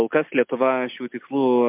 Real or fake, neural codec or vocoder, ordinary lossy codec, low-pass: real; none; MP3, 32 kbps; 3.6 kHz